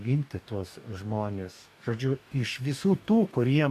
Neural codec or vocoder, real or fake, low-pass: codec, 44.1 kHz, 2.6 kbps, DAC; fake; 14.4 kHz